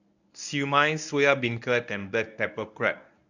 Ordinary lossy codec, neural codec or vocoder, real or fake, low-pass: none; codec, 24 kHz, 0.9 kbps, WavTokenizer, medium speech release version 1; fake; 7.2 kHz